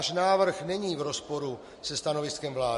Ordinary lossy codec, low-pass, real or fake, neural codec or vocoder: MP3, 48 kbps; 14.4 kHz; real; none